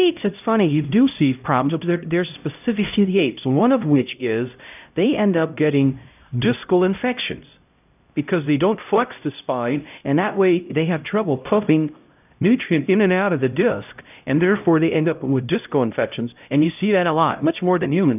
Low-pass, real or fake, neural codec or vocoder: 3.6 kHz; fake; codec, 16 kHz, 0.5 kbps, X-Codec, HuBERT features, trained on LibriSpeech